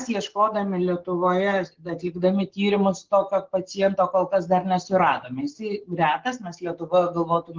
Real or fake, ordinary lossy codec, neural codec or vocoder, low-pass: real; Opus, 16 kbps; none; 7.2 kHz